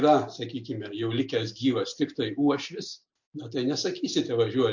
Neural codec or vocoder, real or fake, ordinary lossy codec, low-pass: none; real; MP3, 48 kbps; 7.2 kHz